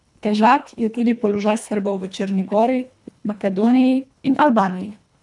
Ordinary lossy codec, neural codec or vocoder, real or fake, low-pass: none; codec, 24 kHz, 1.5 kbps, HILCodec; fake; 10.8 kHz